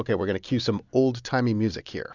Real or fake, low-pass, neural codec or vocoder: real; 7.2 kHz; none